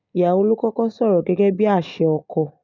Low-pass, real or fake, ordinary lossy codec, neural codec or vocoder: 7.2 kHz; real; none; none